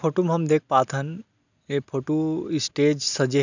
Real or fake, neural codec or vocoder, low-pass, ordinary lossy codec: real; none; 7.2 kHz; none